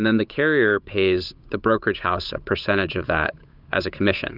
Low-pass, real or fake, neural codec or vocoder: 5.4 kHz; fake; codec, 16 kHz, 8 kbps, FunCodec, trained on Chinese and English, 25 frames a second